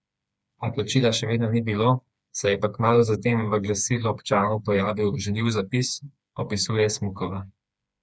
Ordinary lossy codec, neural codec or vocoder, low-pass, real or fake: none; codec, 16 kHz, 4 kbps, FreqCodec, smaller model; none; fake